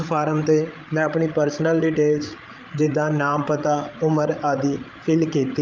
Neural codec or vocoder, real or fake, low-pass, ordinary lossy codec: codec, 16 kHz, 16 kbps, FreqCodec, larger model; fake; 7.2 kHz; Opus, 24 kbps